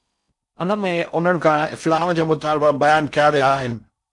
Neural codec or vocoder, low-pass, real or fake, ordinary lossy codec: codec, 16 kHz in and 24 kHz out, 0.6 kbps, FocalCodec, streaming, 4096 codes; 10.8 kHz; fake; MP3, 64 kbps